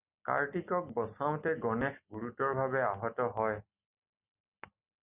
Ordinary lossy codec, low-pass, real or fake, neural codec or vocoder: Opus, 64 kbps; 3.6 kHz; real; none